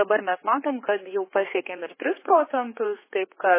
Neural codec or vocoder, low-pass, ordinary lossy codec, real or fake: codec, 16 kHz, 4 kbps, X-Codec, HuBERT features, trained on general audio; 3.6 kHz; MP3, 16 kbps; fake